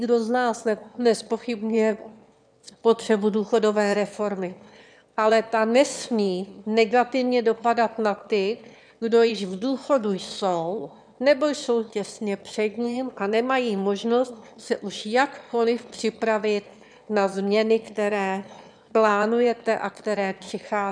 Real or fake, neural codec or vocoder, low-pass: fake; autoencoder, 22.05 kHz, a latent of 192 numbers a frame, VITS, trained on one speaker; 9.9 kHz